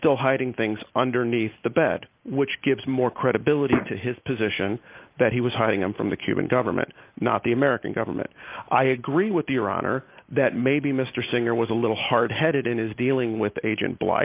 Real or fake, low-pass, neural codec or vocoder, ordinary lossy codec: real; 3.6 kHz; none; Opus, 24 kbps